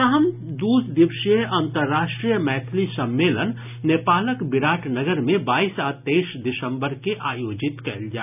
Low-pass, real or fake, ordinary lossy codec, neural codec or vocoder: 3.6 kHz; real; none; none